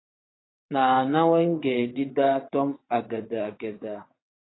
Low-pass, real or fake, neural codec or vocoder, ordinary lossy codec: 7.2 kHz; fake; vocoder, 22.05 kHz, 80 mel bands, WaveNeXt; AAC, 16 kbps